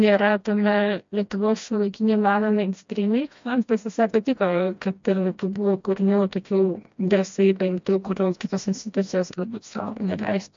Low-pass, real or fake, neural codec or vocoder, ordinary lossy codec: 7.2 kHz; fake; codec, 16 kHz, 1 kbps, FreqCodec, smaller model; MP3, 48 kbps